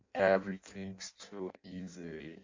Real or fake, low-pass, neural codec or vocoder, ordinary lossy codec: fake; 7.2 kHz; codec, 16 kHz in and 24 kHz out, 0.6 kbps, FireRedTTS-2 codec; MP3, 48 kbps